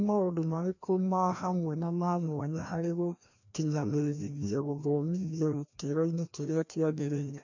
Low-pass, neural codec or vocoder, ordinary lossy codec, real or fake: 7.2 kHz; codec, 16 kHz, 1 kbps, FreqCodec, larger model; none; fake